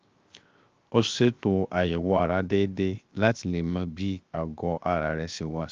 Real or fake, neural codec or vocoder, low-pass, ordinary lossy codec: fake; codec, 16 kHz, 0.7 kbps, FocalCodec; 7.2 kHz; Opus, 24 kbps